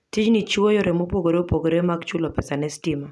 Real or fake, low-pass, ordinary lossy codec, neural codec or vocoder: real; none; none; none